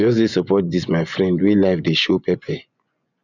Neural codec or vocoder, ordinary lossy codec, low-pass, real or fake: vocoder, 24 kHz, 100 mel bands, Vocos; none; 7.2 kHz; fake